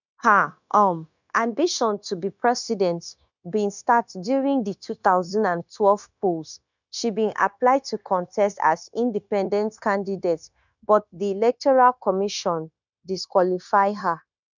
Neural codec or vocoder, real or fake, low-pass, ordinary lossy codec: codec, 16 kHz, 0.9 kbps, LongCat-Audio-Codec; fake; 7.2 kHz; none